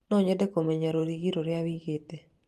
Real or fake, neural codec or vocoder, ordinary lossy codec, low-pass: fake; vocoder, 44.1 kHz, 128 mel bands every 512 samples, BigVGAN v2; Opus, 24 kbps; 14.4 kHz